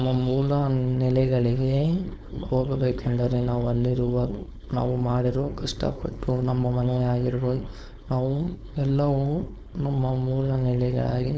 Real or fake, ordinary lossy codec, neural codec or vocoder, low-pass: fake; none; codec, 16 kHz, 4.8 kbps, FACodec; none